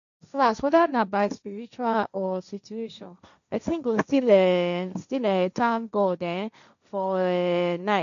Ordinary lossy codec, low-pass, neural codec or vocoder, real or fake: none; 7.2 kHz; codec, 16 kHz, 1.1 kbps, Voila-Tokenizer; fake